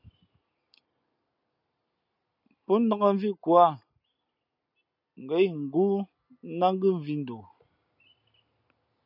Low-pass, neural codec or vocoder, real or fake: 5.4 kHz; none; real